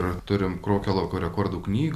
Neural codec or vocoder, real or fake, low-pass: none; real; 14.4 kHz